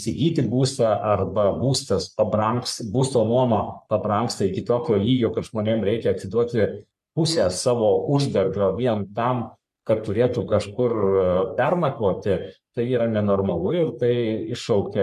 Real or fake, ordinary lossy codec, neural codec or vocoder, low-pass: fake; MP3, 96 kbps; codec, 44.1 kHz, 3.4 kbps, Pupu-Codec; 14.4 kHz